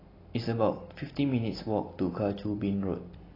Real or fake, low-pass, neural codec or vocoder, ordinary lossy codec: real; 5.4 kHz; none; AAC, 24 kbps